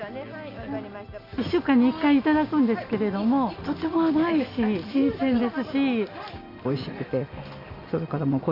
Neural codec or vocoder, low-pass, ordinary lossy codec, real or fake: none; 5.4 kHz; none; real